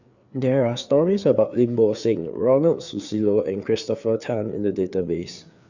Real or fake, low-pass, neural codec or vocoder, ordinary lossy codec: fake; 7.2 kHz; codec, 16 kHz, 4 kbps, FreqCodec, larger model; Opus, 64 kbps